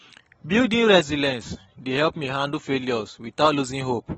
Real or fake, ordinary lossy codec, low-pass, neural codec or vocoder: real; AAC, 24 kbps; 19.8 kHz; none